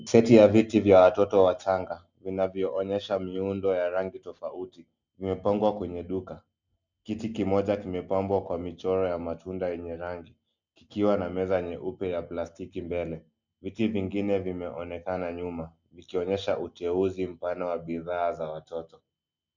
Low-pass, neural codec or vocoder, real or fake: 7.2 kHz; none; real